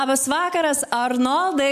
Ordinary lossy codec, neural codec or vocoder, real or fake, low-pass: MP3, 96 kbps; none; real; 14.4 kHz